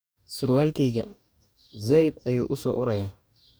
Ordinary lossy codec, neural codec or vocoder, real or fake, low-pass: none; codec, 44.1 kHz, 2.6 kbps, DAC; fake; none